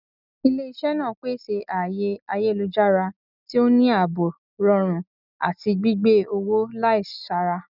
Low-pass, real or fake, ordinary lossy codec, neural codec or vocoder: 5.4 kHz; real; none; none